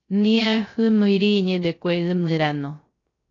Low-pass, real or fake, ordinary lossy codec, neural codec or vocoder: 7.2 kHz; fake; AAC, 32 kbps; codec, 16 kHz, 0.3 kbps, FocalCodec